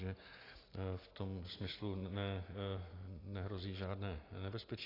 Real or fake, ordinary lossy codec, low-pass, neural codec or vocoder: real; AAC, 24 kbps; 5.4 kHz; none